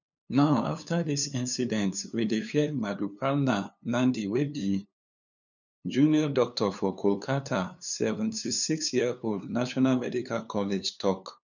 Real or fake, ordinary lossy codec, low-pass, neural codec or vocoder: fake; none; 7.2 kHz; codec, 16 kHz, 2 kbps, FunCodec, trained on LibriTTS, 25 frames a second